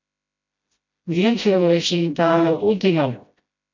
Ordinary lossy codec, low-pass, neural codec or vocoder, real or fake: MP3, 64 kbps; 7.2 kHz; codec, 16 kHz, 0.5 kbps, FreqCodec, smaller model; fake